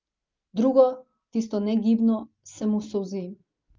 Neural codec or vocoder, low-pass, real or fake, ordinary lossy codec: none; 7.2 kHz; real; Opus, 24 kbps